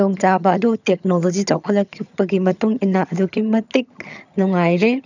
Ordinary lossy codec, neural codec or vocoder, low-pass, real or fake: none; vocoder, 22.05 kHz, 80 mel bands, HiFi-GAN; 7.2 kHz; fake